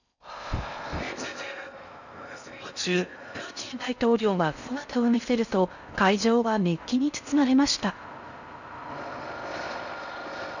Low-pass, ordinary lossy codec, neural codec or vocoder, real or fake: 7.2 kHz; none; codec, 16 kHz in and 24 kHz out, 0.6 kbps, FocalCodec, streaming, 4096 codes; fake